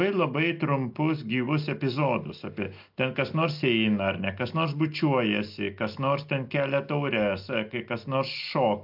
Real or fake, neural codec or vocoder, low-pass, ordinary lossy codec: real; none; 5.4 kHz; MP3, 48 kbps